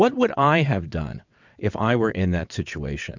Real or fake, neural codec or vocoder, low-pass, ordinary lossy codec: fake; vocoder, 22.05 kHz, 80 mel bands, WaveNeXt; 7.2 kHz; MP3, 64 kbps